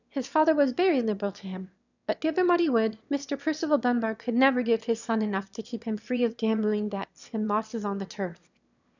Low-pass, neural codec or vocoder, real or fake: 7.2 kHz; autoencoder, 22.05 kHz, a latent of 192 numbers a frame, VITS, trained on one speaker; fake